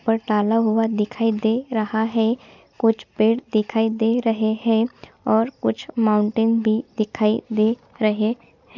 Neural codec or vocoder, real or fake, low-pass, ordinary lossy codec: none; real; 7.2 kHz; none